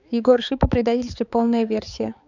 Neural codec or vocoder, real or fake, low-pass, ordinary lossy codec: codec, 16 kHz, 4 kbps, X-Codec, HuBERT features, trained on balanced general audio; fake; 7.2 kHz; none